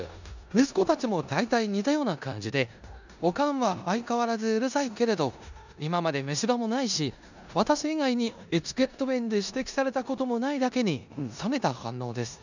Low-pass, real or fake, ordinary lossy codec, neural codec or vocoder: 7.2 kHz; fake; none; codec, 16 kHz in and 24 kHz out, 0.9 kbps, LongCat-Audio-Codec, four codebook decoder